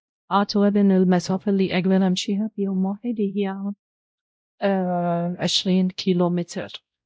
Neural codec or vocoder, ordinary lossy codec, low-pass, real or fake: codec, 16 kHz, 0.5 kbps, X-Codec, WavLM features, trained on Multilingual LibriSpeech; none; none; fake